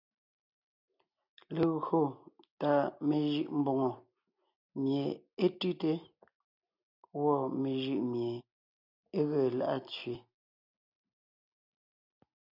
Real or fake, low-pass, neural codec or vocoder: real; 5.4 kHz; none